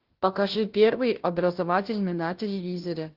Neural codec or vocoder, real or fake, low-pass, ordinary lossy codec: codec, 16 kHz, 0.5 kbps, FunCodec, trained on Chinese and English, 25 frames a second; fake; 5.4 kHz; Opus, 16 kbps